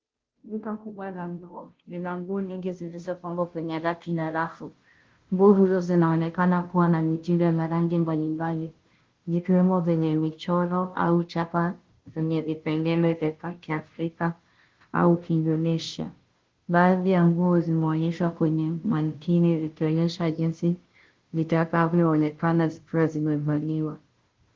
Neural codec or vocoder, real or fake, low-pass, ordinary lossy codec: codec, 16 kHz, 0.5 kbps, FunCodec, trained on Chinese and English, 25 frames a second; fake; 7.2 kHz; Opus, 16 kbps